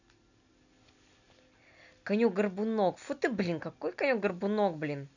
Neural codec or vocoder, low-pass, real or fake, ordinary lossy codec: none; 7.2 kHz; real; none